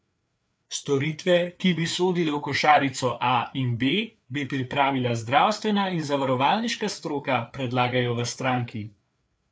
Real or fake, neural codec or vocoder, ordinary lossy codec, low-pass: fake; codec, 16 kHz, 4 kbps, FreqCodec, larger model; none; none